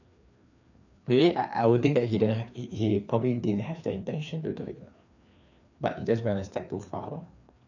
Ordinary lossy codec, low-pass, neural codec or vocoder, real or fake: none; 7.2 kHz; codec, 16 kHz, 2 kbps, FreqCodec, larger model; fake